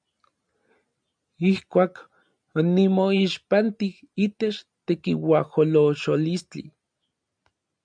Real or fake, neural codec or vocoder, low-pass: real; none; 9.9 kHz